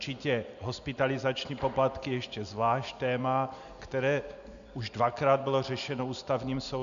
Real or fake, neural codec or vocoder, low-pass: real; none; 7.2 kHz